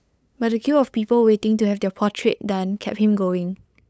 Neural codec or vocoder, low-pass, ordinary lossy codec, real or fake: codec, 16 kHz, 8 kbps, FunCodec, trained on LibriTTS, 25 frames a second; none; none; fake